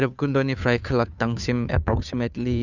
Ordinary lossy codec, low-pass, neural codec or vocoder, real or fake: none; 7.2 kHz; codec, 16 kHz, 2 kbps, FunCodec, trained on Chinese and English, 25 frames a second; fake